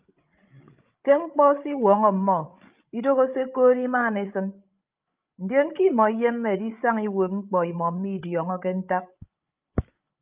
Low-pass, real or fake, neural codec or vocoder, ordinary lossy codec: 3.6 kHz; fake; codec, 16 kHz, 16 kbps, FreqCodec, larger model; Opus, 24 kbps